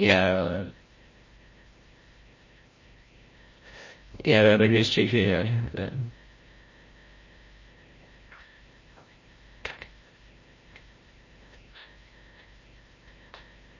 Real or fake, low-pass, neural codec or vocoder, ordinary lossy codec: fake; 7.2 kHz; codec, 16 kHz, 0.5 kbps, FreqCodec, larger model; MP3, 32 kbps